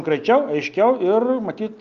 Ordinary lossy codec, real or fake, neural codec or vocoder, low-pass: Opus, 24 kbps; real; none; 7.2 kHz